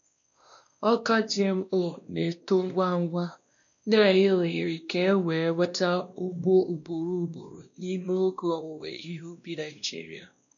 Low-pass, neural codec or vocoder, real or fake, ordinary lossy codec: 7.2 kHz; codec, 16 kHz, 1 kbps, X-Codec, WavLM features, trained on Multilingual LibriSpeech; fake; none